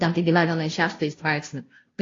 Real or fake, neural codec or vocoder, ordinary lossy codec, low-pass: fake; codec, 16 kHz, 0.5 kbps, FunCodec, trained on Chinese and English, 25 frames a second; AAC, 32 kbps; 7.2 kHz